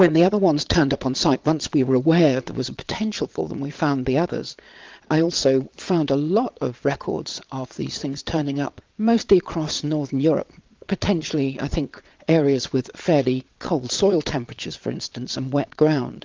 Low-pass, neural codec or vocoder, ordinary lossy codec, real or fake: 7.2 kHz; vocoder, 22.05 kHz, 80 mel bands, Vocos; Opus, 24 kbps; fake